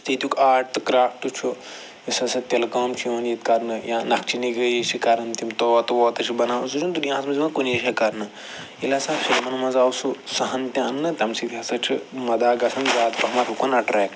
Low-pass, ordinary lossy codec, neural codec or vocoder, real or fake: none; none; none; real